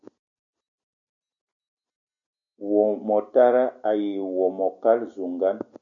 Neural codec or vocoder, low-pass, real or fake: none; 7.2 kHz; real